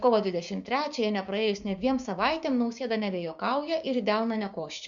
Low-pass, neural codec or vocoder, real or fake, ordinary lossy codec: 7.2 kHz; codec, 16 kHz, 6 kbps, DAC; fake; Opus, 64 kbps